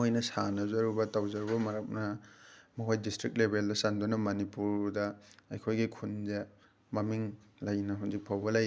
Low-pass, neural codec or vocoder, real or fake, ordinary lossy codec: none; none; real; none